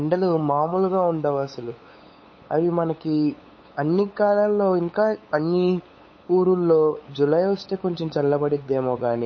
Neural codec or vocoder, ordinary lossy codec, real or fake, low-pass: codec, 16 kHz, 8 kbps, FunCodec, trained on LibriTTS, 25 frames a second; MP3, 32 kbps; fake; 7.2 kHz